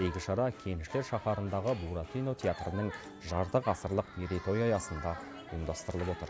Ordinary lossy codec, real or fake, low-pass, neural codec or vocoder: none; real; none; none